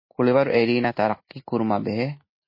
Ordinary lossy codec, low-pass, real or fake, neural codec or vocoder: MP3, 24 kbps; 5.4 kHz; real; none